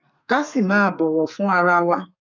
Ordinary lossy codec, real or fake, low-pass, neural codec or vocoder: none; fake; 7.2 kHz; codec, 32 kHz, 1.9 kbps, SNAC